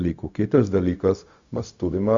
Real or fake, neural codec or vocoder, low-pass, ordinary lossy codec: fake; codec, 16 kHz, 0.4 kbps, LongCat-Audio-Codec; 7.2 kHz; Opus, 64 kbps